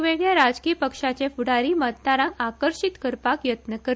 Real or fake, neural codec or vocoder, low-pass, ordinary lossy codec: real; none; none; none